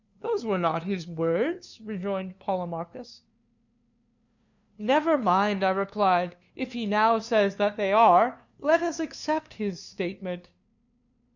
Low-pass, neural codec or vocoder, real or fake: 7.2 kHz; codec, 16 kHz, 2 kbps, FunCodec, trained on LibriTTS, 25 frames a second; fake